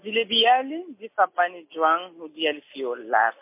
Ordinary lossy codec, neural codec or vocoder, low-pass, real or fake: MP3, 24 kbps; none; 3.6 kHz; real